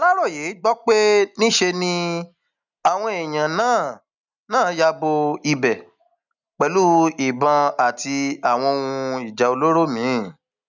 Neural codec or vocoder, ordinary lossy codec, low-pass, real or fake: none; none; 7.2 kHz; real